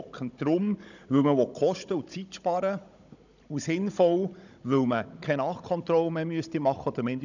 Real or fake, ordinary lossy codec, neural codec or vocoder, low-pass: fake; none; codec, 16 kHz, 16 kbps, FunCodec, trained on Chinese and English, 50 frames a second; 7.2 kHz